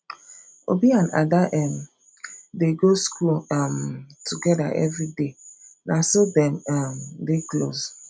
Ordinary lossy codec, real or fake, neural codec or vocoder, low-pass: none; real; none; none